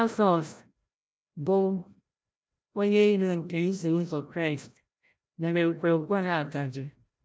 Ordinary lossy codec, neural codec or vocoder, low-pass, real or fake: none; codec, 16 kHz, 0.5 kbps, FreqCodec, larger model; none; fake